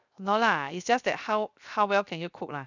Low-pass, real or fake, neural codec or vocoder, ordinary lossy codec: 7.2 kHz; fake; codec, 16 kHz, 0.7 kbps, FocalCodec; none